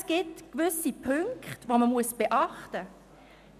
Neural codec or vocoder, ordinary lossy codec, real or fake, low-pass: none; none; real; 14.4 kHz